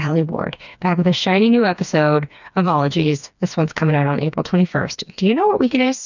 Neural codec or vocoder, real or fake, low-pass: codec, 16 kHz, 2 kbps, FreqCodec, smaller model; fake; 7.2 kHz